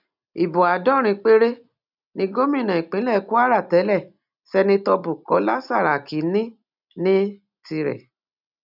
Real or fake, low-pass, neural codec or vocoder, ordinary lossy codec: real; 5.4 kHz; none; none